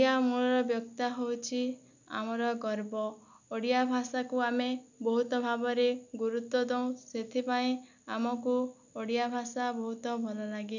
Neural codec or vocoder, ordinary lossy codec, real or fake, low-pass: none; none; real; 7.2 kHz